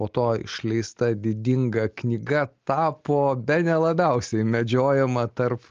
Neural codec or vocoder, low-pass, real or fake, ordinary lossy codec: none; 7.2 kHz; real; Opus, 24 kbps